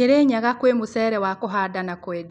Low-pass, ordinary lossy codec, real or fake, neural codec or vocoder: 9.9 kHz; none; real; none